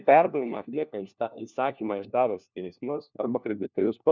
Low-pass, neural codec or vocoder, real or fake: 7.2 kHz; codec, 16 kHz, 1 kbps, FunCodec, trained on LibriTTS, 50 frames a second; fake